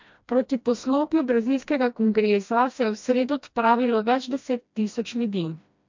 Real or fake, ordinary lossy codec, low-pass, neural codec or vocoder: fake; MP3, 64 kbps; 7.2 kHz; codec, 16 kHz, 1 kbps, FreqCodec, smaller model